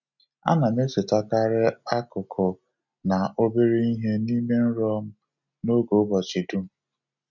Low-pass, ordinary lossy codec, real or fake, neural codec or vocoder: 7.2 kHz; none; real; none